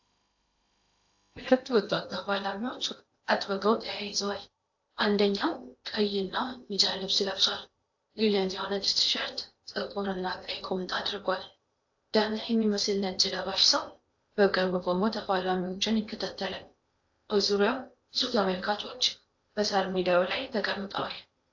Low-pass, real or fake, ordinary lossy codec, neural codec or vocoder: 7.2 kHz; fake; AAC, 48 kbps; codec, 16 kHz in and 24 kHz out, 0.8 kbps, FocalCodec, streaming, 65536 codes